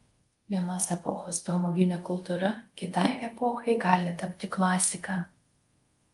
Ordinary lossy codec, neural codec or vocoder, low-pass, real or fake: Opus, 32 kbps; codec, 24 kHz, 0.5 kbps, DualCodec; 10.8 kHz; fake